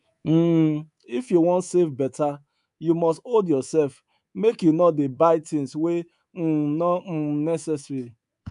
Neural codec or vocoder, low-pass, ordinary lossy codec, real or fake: codec, 24 kHz, 3.1 kbps, DualCodec; 10.8 kHz; none; fake